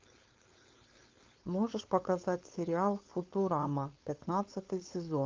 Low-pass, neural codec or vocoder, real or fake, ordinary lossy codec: 7.2 kHz; codec, 16 kHz, 4.8 kbps, FACodec; fake; Opus, 32 kbps